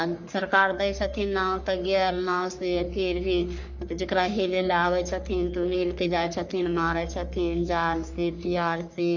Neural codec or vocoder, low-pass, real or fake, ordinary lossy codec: codec, 44.1 kHz, 3.4 kbps, Pupu-Codec; 7.2 kHz; fake; none